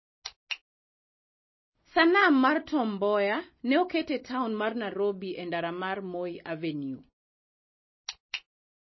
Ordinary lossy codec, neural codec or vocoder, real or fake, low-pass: MP3, 24 kbps; none; real; 7.2 kHz